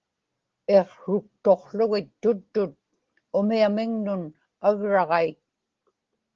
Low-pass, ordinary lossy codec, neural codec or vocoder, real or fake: 7.2 kHz; Opus, 16 kbps; none; real